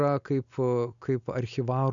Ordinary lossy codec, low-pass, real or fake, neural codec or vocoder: MP3, 96 kbps; 7.2 kHz; real; none